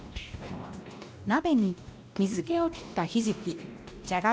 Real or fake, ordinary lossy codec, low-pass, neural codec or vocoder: fake; none; none; codec, 16 kHz, 1 kbps, X-Codec, WavLM features, trained on Multilingual LibriSpeech